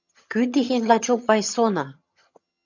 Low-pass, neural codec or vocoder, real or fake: 7.2 kHz; vocoder, 22.05 kHz, 80 mel bands, HiFi-GAN; fake